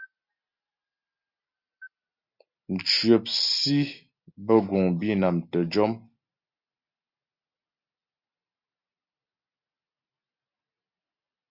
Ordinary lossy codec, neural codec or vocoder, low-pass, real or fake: Opus, 64 kbps; none; 5.4 kHz; real